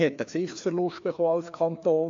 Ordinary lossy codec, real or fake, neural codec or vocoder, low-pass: AAC, 48 kbps; fake; codec, 16 kHz, 2 kbps, FreqCodec, larger model; 7.2 kHz